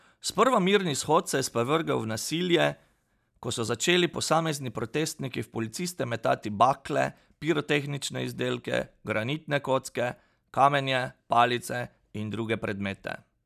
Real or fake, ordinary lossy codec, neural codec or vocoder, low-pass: real; none; none; 14.4 kHz